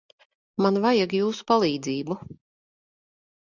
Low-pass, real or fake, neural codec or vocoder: 7.2 kHz; real; none